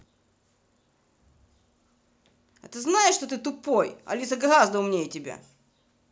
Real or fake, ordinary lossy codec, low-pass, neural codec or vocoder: real; none; none; none